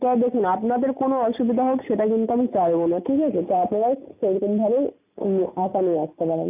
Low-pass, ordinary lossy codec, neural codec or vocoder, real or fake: 3.6 kHz; none; none; real